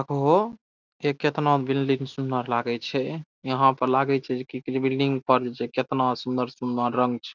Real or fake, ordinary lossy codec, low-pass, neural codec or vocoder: real; none; 7.2 kHz; none